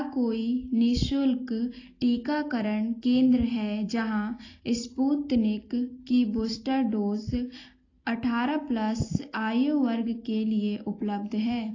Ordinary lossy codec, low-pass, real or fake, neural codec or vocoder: AAC, 32 kbps; 7.2 kHz; real; none